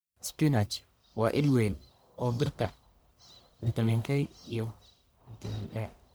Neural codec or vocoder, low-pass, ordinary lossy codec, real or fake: codec, 44.1 kHz, 1.7 kbps, Pupu-Codec; none; none; fake